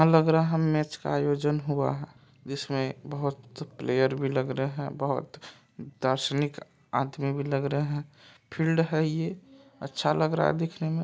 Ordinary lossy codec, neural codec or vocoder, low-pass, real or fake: none; none; none; real